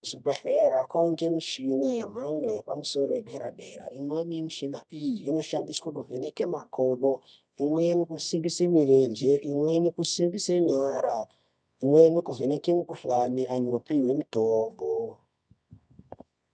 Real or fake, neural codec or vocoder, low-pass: fake; codec, 24 kHz, 0.9 kbps, WavTokenizer, medium music audio release; 9.9 kHz